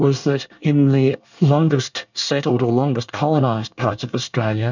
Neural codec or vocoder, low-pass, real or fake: codec, 24 kHz, 1 kbps, SNAC; 7.2 kHz; fake